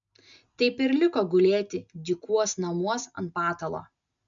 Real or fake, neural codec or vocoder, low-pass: real; none; 7.2 kHz